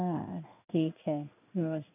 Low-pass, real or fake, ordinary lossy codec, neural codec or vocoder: 3.6 kHz; fake; none; codec, 16 kHz in and 24 kHz out, 1 kbps, XY-Tokenizer